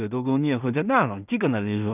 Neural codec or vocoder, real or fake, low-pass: codec, 16 kHz in and 24 kHz out, 0.4 kbps, LongCat-Audio-Codec, two codebook decoder; fake; 3.6 kHz